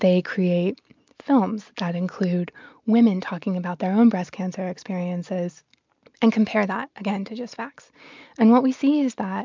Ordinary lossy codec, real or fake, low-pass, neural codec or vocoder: MP3, 64 kbps; real; 7.2 kHz; none